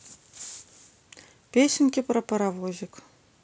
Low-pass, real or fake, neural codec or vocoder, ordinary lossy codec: none; real; none; none